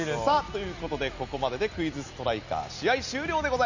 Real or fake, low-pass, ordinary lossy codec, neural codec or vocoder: real; 7.2 kHz; none; none